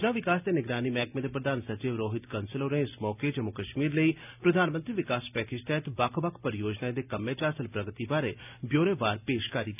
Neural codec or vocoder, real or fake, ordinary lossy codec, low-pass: none; real; none; 3.6 kHz